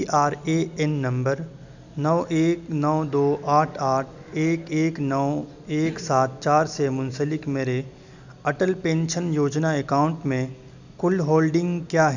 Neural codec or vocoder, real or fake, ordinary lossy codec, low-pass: none; real; none; 7.2 kHz